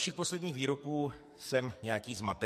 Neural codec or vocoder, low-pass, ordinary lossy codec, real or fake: codec, 44.1 kHz, 2.6 kbps, SNAC; 14.4 kHz; MP3, 64 kbps; fake